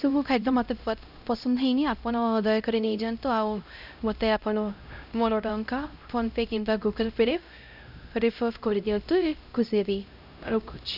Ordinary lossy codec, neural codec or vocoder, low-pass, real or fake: none; codec, 16 kHz, 0.5 kbps, X-Codec, HuBERT features, trained on LibriSpeech; 5.4 kHz; fake